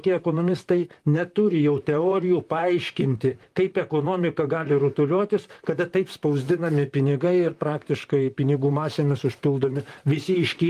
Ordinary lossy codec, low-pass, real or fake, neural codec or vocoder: Opus, 32 kbps; 14.4 kHz; fake; vocoder, 44.1 kHz, 128 mel bands, Pupu-Vocoder